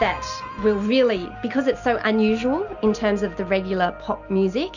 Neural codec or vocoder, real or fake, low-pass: none; real; 7.2 kHz